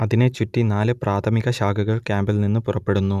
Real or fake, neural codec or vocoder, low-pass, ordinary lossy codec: real; none; 14.4 kHz; none